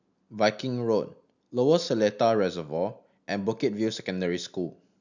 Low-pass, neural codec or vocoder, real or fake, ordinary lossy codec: 7.2 kHz; none; real; none